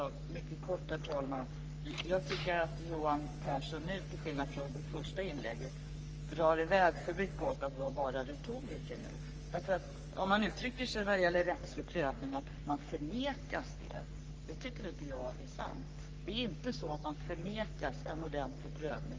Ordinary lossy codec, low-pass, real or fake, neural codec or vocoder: Opus, 24 kbps; 7.2 kHz; fake; codec, 44.1 kHz, 3.4 kbps, Pupu-Codec